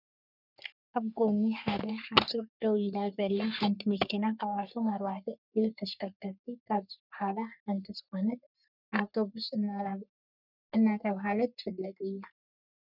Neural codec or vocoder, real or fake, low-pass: codec, 44.1 kHz, 3.4 kbps, Pupu-Codec; fake; 5.4 kHz